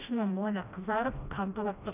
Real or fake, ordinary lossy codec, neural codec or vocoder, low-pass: fake; none; codec, 16 kHz, 1 kbps, FreqCodec, smaller model; 3.6 kHz